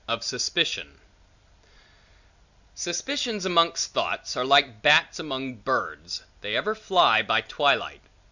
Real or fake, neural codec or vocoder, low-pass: real; none; 7.2 kHz